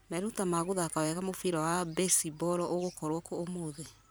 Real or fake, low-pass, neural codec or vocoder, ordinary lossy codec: fake; none; vocoder, 44.1 kHz, 128 mel bands every 512 samples, BigVGAN v2; none